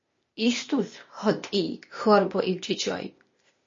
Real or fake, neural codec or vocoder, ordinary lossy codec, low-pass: fake; codec, 16 kHz, 0.8 kbps, ZipCodec; MP3, 32 kbps; 7.2 kHz